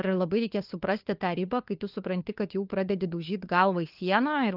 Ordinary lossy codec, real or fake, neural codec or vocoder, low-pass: Opus, 32 kbps; fake; codec, 16 kHz, 4 kbps, FunCodec, trained on LibriTTS, 50 frames a second; 5.4 kHz